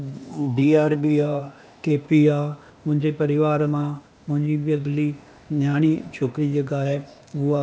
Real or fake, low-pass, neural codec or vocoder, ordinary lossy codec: fake; none; codec, 16 kHz, 0.8 kbps, ZipCodec; none